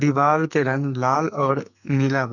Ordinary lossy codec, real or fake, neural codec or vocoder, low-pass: none; fake; codec, 44.1 kHz, 2.6 kbps, SNAC; 7.2 kHz